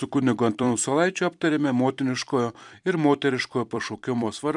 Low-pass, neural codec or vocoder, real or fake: 10.8 kHz; vocoder, 44.1 kHz, 128 mel bands every 256 samples, BigVGAN v2; fake